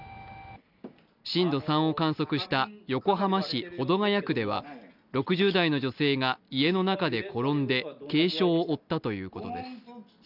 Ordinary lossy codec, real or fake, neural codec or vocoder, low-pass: none; real; none; 5.4 kHz